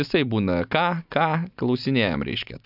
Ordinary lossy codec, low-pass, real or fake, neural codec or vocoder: AAC, 48 kbps; 5.4 kHz; real; none